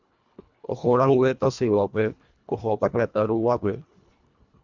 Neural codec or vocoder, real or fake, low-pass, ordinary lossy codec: codec, 24 kHz, 1.5 kbps, HILCodec; fake; 7.2 kHz; Opus, 64 kbps